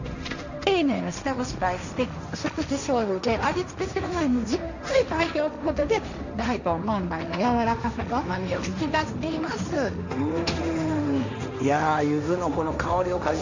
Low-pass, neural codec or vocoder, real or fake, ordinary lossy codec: 7.2 kHz; codec, 16 kHz, 1.1 kbps, Voila-Tokenizer; fake; none